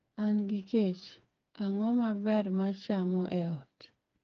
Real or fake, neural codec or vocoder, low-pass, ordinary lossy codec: fake; codec, 16 kHz, 4 kbps, FreqCodec, smaller model; 7.2 kHz; Opus, 24 kbps